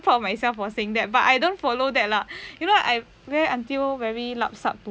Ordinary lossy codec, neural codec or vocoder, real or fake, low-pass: none; none; real; none